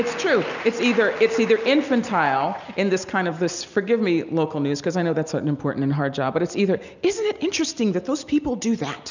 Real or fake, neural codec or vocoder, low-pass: real; none; 7.2 kHz